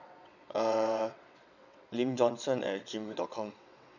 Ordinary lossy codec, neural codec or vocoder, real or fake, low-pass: none; vocoder, 22.05 kHz, 80 mel bands, WaveNeXt; fake; 7.2 kHz